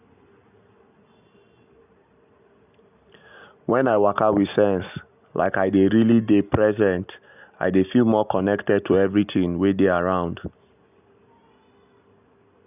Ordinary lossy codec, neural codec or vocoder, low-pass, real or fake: none; none; 3.6 kHz; real